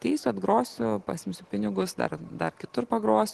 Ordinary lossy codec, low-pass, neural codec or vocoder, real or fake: Opus, 16 kbps; 10.8 kHz; none; real